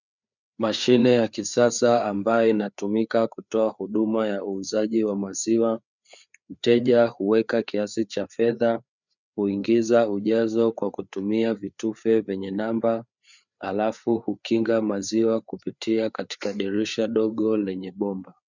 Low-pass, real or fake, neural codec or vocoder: 7.2 kHz; fake; codec, 16 kHz, 4 kbps, FreqCodec, larger model